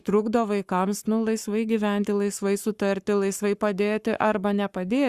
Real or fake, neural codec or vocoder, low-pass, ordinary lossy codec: fake; codec, 44.1 kHz, 7.8 kbps, Pupu-Codec; 14.4 kHz; Opus, 64 kbps